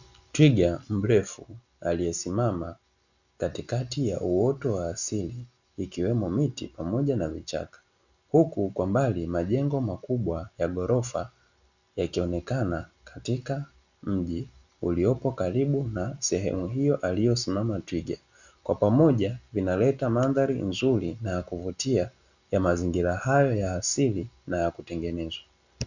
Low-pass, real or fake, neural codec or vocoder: 7.2 kHz; real; none